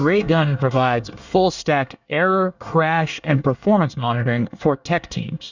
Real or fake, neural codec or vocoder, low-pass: fake; codec, 24 kHz, 1 kbps, SNAC; 7.2 kHz